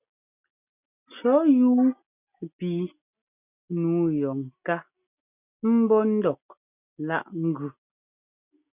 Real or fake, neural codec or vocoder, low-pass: real; none; 3.6 kHz